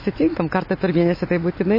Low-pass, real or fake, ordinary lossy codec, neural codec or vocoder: 5.4 kHz; real; MP3, 24 kbps; none